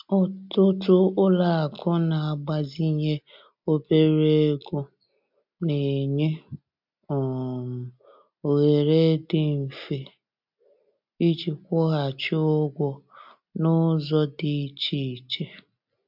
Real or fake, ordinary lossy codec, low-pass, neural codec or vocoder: real; MP3, 48 kbps; 5.4 kHz; none